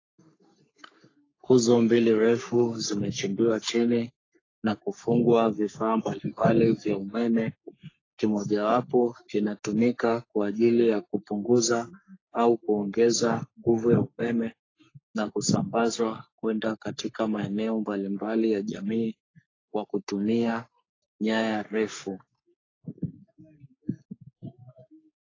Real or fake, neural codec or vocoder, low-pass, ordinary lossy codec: fake; codec, 44.1 kHz, 3.4 kbps, Pupu-Codec; 7.2 kHz; AAC, 32 kbps